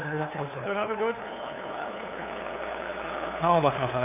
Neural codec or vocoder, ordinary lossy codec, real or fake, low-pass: codec, 16 kHz, 2 kbps, FunCodec, trained on LibriTTS, 25 frames a second; none; fake; 3.6 kHz